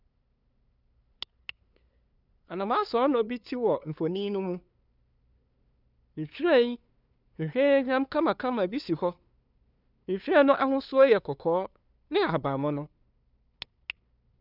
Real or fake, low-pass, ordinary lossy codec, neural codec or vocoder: fake; 5.4 kHz; none; codec, 16 kHz, 2 kbps, FunCodec, trained on LibriTTS, 25 frames a second